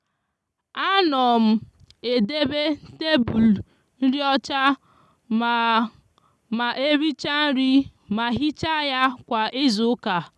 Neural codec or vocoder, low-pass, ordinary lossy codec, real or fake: none; none; none; real